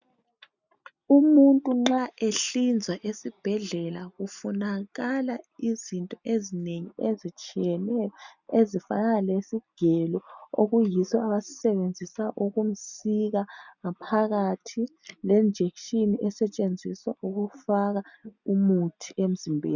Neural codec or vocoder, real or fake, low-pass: none; real; 7.2 kHz